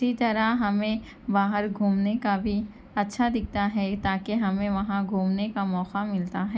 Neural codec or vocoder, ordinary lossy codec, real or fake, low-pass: none; none; real; none